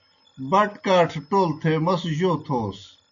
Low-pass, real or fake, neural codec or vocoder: 7.2 kHz; real; none